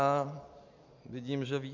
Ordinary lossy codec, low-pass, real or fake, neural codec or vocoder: MP3, 48 kbps; 7.2 kHz; real; none